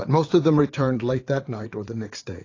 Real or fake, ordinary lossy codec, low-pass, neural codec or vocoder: fake; AAC, 32 kbps; 7.2 kHz; vocoder, 44.1 kHz, 80 mel bands, Vocos